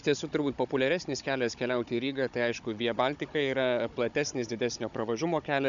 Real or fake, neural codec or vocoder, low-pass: fake; codec, 16 kHz, 16 kbps, FunCodec, trained on Chinese and English, 50 frames a second; 7.2 kHz